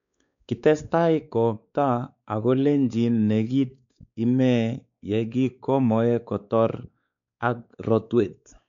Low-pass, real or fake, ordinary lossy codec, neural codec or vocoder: 7.2 kHz; fake; none; codec, 16 kHz, 4 kbps, X-Codec, WavLM features, trained on Multilingual LibriSpeech